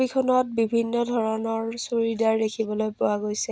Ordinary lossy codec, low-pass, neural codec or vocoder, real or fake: none; none; none; real